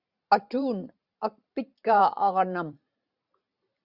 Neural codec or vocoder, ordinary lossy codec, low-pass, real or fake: vocoder, 44.1 kHz, 128 mel bands every 512 samples, BigVGAN v2; Opus, 64 kbps; 5.4 kHz; fake